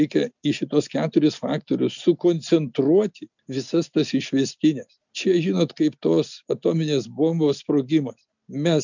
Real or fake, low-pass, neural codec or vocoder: real; 7.2 kHz; none